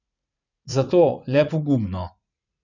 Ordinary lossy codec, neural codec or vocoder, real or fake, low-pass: none; vocoder, 44.1 kHz, 80 mel bands, Vocos; fake; 7.2 kHz